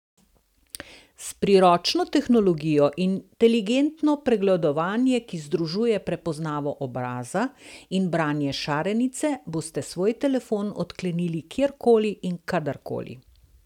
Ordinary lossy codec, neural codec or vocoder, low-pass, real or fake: none; none; 19.8 kHz; real